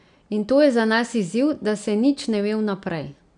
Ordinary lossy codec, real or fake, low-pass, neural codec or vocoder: none; real; 9.9 kHz; none